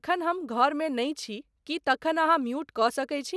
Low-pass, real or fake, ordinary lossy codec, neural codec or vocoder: none; real; none; none